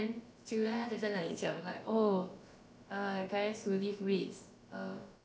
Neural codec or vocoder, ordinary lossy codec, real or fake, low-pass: codec, 16 kHz, about 1 kbps, DyCAST, with the encoder's durations; none; fake; none